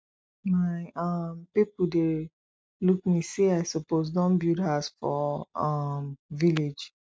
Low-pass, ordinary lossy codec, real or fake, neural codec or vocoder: none; none; real; none